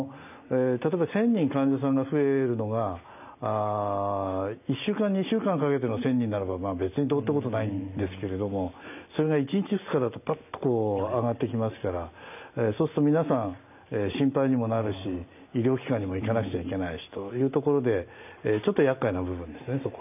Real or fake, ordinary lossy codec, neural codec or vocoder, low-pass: real; none; none; 3.6 kHz